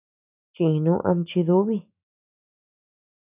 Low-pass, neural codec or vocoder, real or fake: 3.6 kHz; autoencoder, 48 kHz, 128 numbers a frame, DAC-VAE, trained on Japanese speech; fake